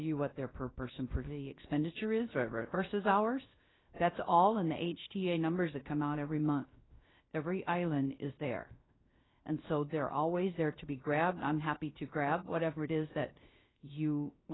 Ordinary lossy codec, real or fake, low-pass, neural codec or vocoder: AAC, 16 kbps; fake; 7.2 kHz; codec, 16 kHz, 0.3 kbps, FocalCodec